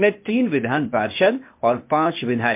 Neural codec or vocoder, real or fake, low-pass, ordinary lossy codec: codec, 16 kHz, 0.8 kbps, ZipCodec; fake; 3.6 kHz; MP3, 32 kbps